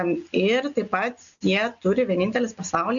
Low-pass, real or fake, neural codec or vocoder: 7.2 kHz; real; none